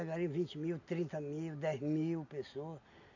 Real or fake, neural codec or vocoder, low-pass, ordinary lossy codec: real; none; 7.2 kHz; none